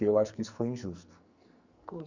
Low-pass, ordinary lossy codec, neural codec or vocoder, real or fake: 7.2 kHz; Opus, 64 kbps; codec, 44.1 kHz, 2.6 kbps, SNAC; fake